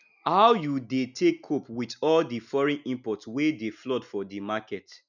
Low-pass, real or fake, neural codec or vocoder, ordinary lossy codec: 7.2 kHz; real; none; none